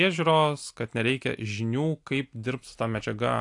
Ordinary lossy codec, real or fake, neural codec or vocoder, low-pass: AAC, 64 kbps; real; none; 10.8 kHz